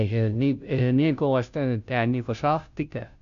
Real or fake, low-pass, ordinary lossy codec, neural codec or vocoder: fake; 7.2 kHz; none; codec, 16 kHz, 0.5 kbps, FunCodec, trained on Chinese and English, 25 frames a second